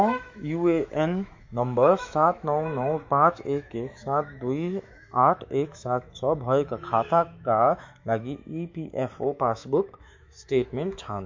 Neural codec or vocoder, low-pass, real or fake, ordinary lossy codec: autoencoder, 48 kHz, 128 numbers a frame, DAC-VAE, trained on Japanese speech; 7.2 kHz; fake; MP3, 48 kbps